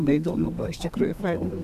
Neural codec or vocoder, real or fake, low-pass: codec, 32 kHz, 1.9 kbps, SNAC; fake; 14.4 kHz